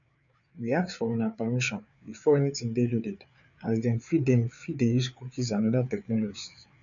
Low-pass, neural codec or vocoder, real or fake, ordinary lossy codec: 7.2 kHz; codec, 16 kHz, 4 kbps, FreqCodec, larger model; fake; none